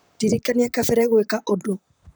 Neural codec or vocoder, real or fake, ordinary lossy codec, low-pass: vocoder, 44.1 kHz, 128 mel bands, Pupu-Vocoder; fake; none; none